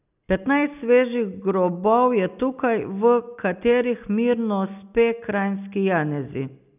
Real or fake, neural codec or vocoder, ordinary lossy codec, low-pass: real; none; none; 3.6 kHz